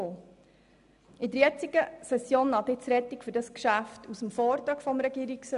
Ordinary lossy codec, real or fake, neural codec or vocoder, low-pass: none; real; none; 10.8 kHz